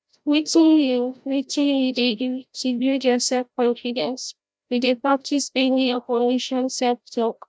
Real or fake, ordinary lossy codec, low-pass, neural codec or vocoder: fake; none; none; codec, 16 kHz, 0.5 kbps, FreqCodec, larger model